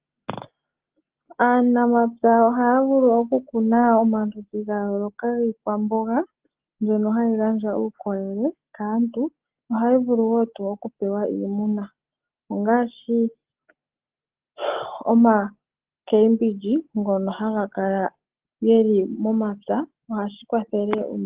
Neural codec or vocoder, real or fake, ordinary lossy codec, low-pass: none; real; Opus, 16 kbps; 3.6 kHz